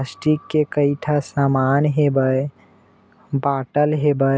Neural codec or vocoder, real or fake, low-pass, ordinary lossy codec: none; real; none; none